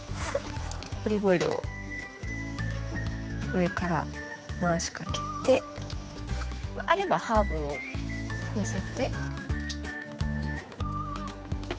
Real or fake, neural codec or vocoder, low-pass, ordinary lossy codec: fake; codec, 16 kHz, 4 kbps, X-Codec, HuBERT features, trained on general audio; none; none